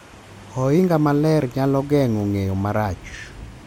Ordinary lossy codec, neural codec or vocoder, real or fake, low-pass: MP3, 64 kbps; none; real; 19.8 kHz